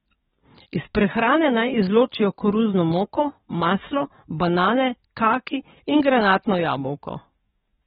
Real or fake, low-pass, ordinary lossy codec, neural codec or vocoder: fake; 19.8 kHz; AAC, 16 kbps; codec, 44.1 kHz, 7.8 kbps, DAC